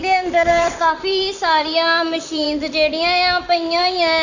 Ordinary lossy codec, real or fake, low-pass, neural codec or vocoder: none; fake; 7.2 kHz; vocoder, 44.1 kHz, 128 mel bands, Pupu-Vocoder